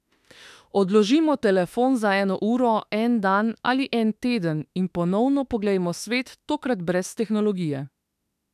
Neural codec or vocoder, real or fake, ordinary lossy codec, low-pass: autoencoder, 48 kHz, 32 numbers a frame, DAC-VAE, trained on Japanese speech; fake; none; 14.4 kHz